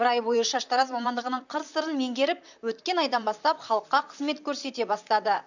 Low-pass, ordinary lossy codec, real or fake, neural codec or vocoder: 7.2 kHz; none; fake; vocoder, 44.1 kHz, 128 mel bands, Pupu-Vocoder